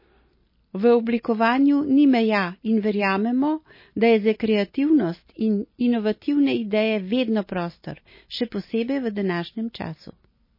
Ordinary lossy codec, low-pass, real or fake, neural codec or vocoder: MP3, 24 kbps; 5.4 kHz; real; none